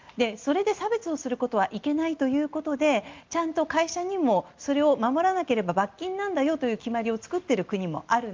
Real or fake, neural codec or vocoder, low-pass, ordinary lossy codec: real; none; 7.2 kHz; Opus, 32 kbps